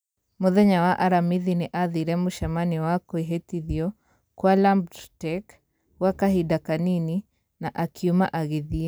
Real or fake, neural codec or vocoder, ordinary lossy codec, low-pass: real; none; none; none